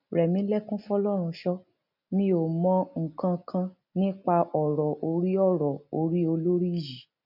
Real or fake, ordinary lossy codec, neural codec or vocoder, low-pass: real; none; none; 5.4 kHz